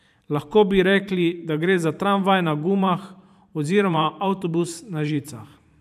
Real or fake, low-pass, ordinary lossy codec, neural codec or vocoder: fake; 14.4 kHz; none; vocoder, 44.1 kHz, 128 mel bands every 512 samples, BigVGAN v2